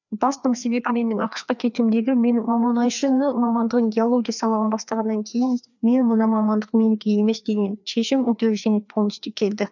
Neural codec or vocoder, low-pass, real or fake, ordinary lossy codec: codec, 16 kHz, 2 kbps, FreqCodec, larger model; 7.2 kHz; fake; none